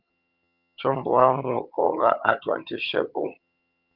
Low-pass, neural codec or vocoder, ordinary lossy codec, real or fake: 5.4 kHz; vocoder, 22.05 kHz, 80 mel bands, HiFi-GAN; Opus, 24 kbps; fake